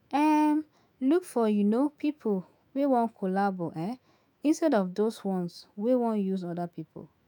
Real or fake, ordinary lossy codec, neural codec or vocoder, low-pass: fake; none; autoencoder, 48 kHz, 128 numbers a frame, DAC-VAE, trained on Japanese speech; 19.8 kHz